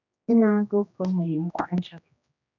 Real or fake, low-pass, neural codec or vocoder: fake; 7.2 kHz; codec, 16 kHz, 1 kbps, X-Codec, HuBERT features, trained on general audio